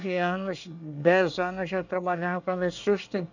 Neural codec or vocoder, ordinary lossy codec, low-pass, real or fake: codec, 24 kHz, 1 kbps, SNAC; none; 7.2 kHz; fake